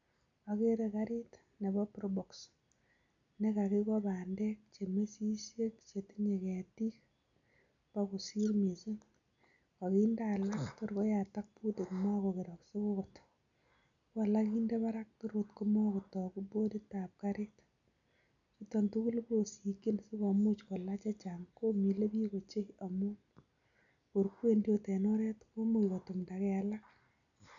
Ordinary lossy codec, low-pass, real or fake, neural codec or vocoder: none; 7.2 kHz; real; none